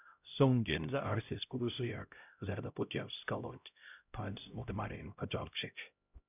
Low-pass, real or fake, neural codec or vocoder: 3.6 kHz; fake; codec, 16 kHz, 0.5 kbps, X-Codec, HuBERT features, trained on LibriSpeech